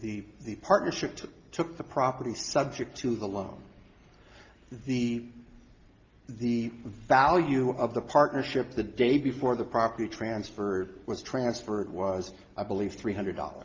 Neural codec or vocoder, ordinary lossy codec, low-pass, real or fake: none; Opus, 32 kbps; 7.2 kHz; real